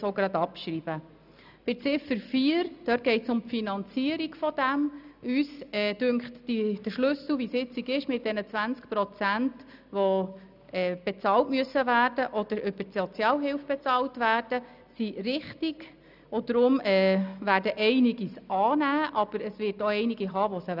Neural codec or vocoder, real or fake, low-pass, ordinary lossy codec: none; real; 5.4 kHz; none